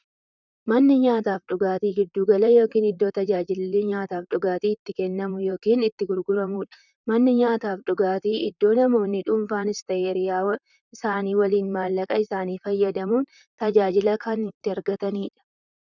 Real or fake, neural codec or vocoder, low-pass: fake; vocoder, 44.1 kHz, 128 mel bands, Pupu-Vocoder; 7.2 kHz